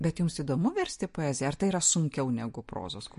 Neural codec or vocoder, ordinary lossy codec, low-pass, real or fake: none; MP3, 48 kbps; 14.4 kHz; real